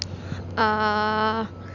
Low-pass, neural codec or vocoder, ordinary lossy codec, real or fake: 7.2 kHz; none; none; real